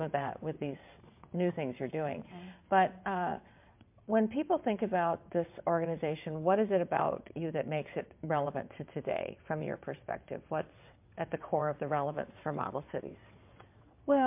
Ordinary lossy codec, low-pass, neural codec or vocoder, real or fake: MP3, 32 kbps; 3.6 kHz; vocoder, 22.05 kHz, 80 mel bands, WaveNeXt; fake